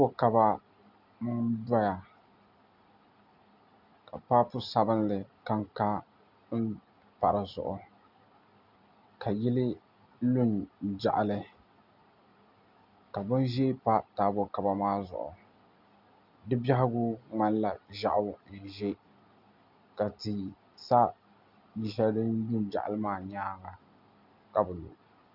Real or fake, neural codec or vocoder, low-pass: real; none; 5.4 kHz